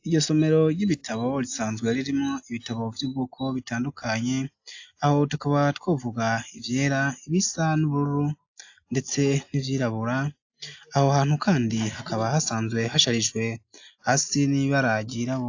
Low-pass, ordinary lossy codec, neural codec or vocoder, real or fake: 7.2 kHz; AAC, 48 kbps; none; real